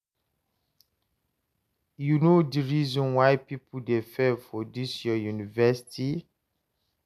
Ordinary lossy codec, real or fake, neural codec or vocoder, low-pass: MP3, 96 kbps; real; none; 14.4 kHz